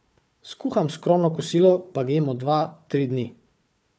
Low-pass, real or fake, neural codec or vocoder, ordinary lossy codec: none; fake; codec, 16 kHz, 4 kbps, FunCodec, trained on Chinese and English, 50 frames a second; none